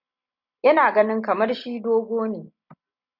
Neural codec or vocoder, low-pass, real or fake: none; 5.4 kHz; real